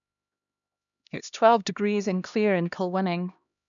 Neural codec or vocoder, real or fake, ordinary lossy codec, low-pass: codec, 16 kHz, 1 kbps, X-Codec, HuBERT features, trained on LibriSpeech; fake; none; 7.2 kHz